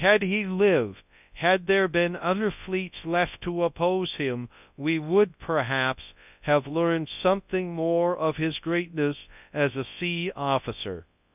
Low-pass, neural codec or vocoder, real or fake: 3.6 kHz; codec, 24 kHz, 0.9 kbps, WavTokenizer, large speech release; fake